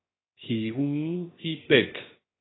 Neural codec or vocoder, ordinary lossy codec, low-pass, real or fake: codec, 16 kHz, 0.7 kbps, FocalCodec; AAC, 16 kbps; 7.2 kHz; fake